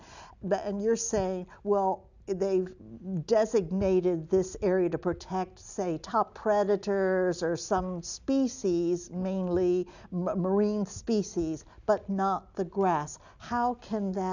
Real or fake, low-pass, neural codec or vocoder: real; 7.2 kHz; none